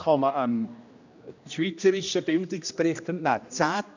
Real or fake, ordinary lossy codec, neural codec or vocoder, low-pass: fake; none; codec, 16 kHz, 1 kbps, X-Codec, HuBERT features, trained on general audio; 7.2 kHz